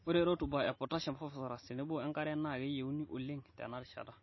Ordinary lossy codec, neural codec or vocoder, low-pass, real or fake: MP3, 24 kbps; none; 7.2 kHz; real